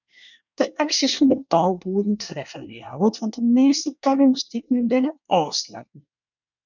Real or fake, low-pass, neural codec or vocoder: fake; 7.2 kHz; codec, 24 kHz, 1 kbps, SNAC